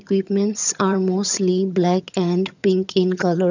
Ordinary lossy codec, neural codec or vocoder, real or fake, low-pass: none; vocoder, 22.05 kHz, 80 mel bands, HiFi-GAN; fake; 7.2 kHz